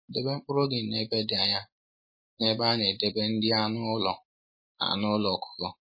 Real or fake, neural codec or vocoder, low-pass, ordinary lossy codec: real; none; 5.4 kHz; MP3, 24 kbps